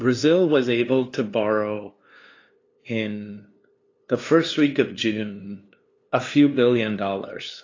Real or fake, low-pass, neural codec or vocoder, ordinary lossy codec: fake; 7.2 kHz; codec, 16 kHz, 2 kbps, FunCodec, trained on LibriTTS, 25 frames a second; AAC, 32 kbps